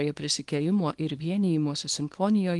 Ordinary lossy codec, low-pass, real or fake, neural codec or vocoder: Opus, 24 kbps; 10.8 kHz; fake; codec, 24 kHz, 0.9 kbps, WavTokenizer, small release